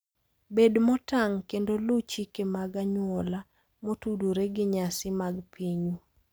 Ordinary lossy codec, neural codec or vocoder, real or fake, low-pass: none; none; real; none